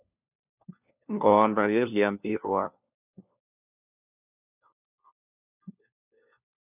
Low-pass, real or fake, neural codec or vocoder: 3.6 kHz; fake; codec, 16 kHz, 1 kbps, FunCodec, trained on LibriTTS, 50 frames a second